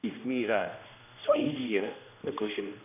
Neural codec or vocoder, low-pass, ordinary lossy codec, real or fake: codec, 16 kHz, 1 kbps, X-Codec, HuBERT features, trained on general audio; 3.6 kHz; none; fake